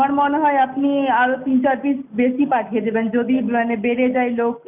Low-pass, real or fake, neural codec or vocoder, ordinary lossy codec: 3.6 kHz; real; none; none